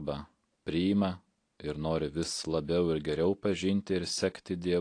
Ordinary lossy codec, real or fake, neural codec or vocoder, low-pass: AAC, 48 kbps; real; none; 9.9 kHz